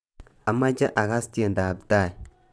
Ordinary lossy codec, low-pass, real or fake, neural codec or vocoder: none; none; fake; vocoder, 22.05 kHz, 80 mel bands, WaveNeXt